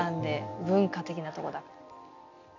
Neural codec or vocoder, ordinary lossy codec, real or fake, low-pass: none; none; real; 7.2 kHz